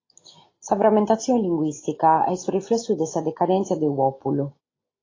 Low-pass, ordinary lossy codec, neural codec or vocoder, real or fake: 7.2 kHz; AAC, 32 kbps; none; real